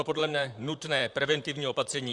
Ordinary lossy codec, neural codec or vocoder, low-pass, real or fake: Opus, 64 kbps; vocoder, 44.1 kHz, 128 mel bands, Pupu-Vocoder; 10.8 kHz; fake